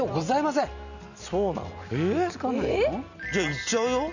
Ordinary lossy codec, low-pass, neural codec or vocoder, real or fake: none; 7.2 kHz; none; real